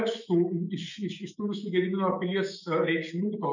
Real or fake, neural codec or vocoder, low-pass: fake; autoencoder, 48 kHz, 128 numbers a frame, DAC-VAE, trained on Japanese speech; 7.2 kHz